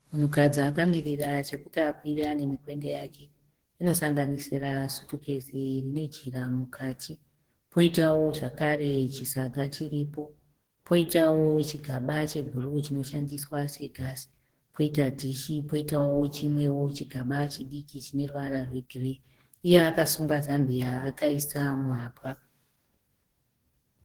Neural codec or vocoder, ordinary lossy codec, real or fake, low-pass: codec, 44.1 kHz, 2.6 kbps, DAC; Opus, 16 kbps; fake; 19.8 kHz